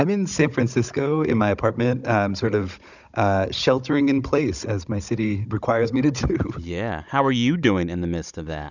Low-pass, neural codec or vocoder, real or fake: 7.2 kHz; codec, 16 kHz, 16 kbps, FunCodec, trained on Chinese and English, 50 frames a second; fake